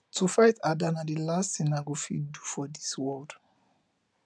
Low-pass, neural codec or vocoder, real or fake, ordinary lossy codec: none; none; real; none